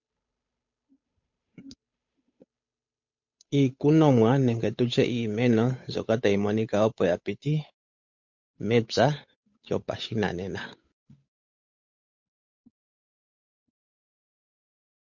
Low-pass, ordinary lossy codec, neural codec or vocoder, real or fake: 7.2 kHz; MP3, 48 kbps; codec, 16 kHz, 8 kbps, FunCodec, trained on Chinese and English, 25 frames a second; fake